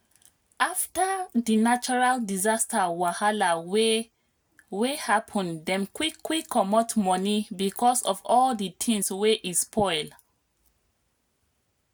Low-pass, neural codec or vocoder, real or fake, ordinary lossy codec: none; none; real; none